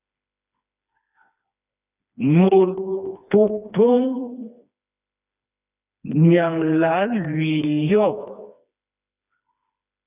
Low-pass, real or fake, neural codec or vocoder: 3.6 kHz; fake; codec, 16 kHz, 2 kbps, FreqCodec, smaller model